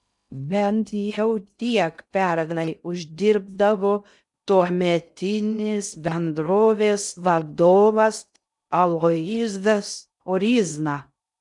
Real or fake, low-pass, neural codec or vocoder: fake; 10.8 kHz; codec, 16 kHz in and 24 kHz out, 0.6 kbps, FocalCodec, streaming, 2048 codes